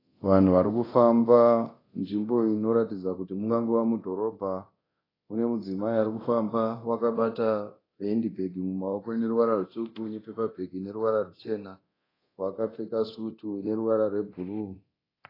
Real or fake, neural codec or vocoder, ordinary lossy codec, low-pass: fake; codec, 24 kHz, 0.9 kbps, DualCodec; AAC, 24 kbps; 5.4 kHz